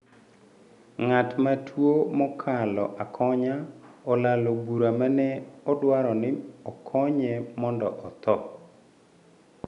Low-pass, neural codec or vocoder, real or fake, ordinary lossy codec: 10.8 kHz; none; real; none